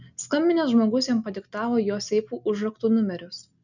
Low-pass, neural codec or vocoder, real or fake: 7.2 kHz; none; real